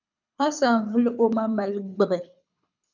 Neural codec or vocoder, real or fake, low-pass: codec, 24 kHz, 6 kbps, HILCodec; fake; 7.2 kHz